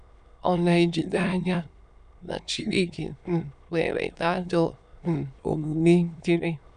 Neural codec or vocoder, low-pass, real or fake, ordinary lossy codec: autoencoder, 22.05 kHz, a latent of 192 numbers a frame, VITS, trained on many speakers; 9.9 kHz; fake; none